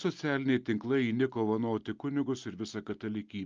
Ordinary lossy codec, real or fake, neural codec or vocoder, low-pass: Opus, 24 kbps; real; none; 7.2 kHz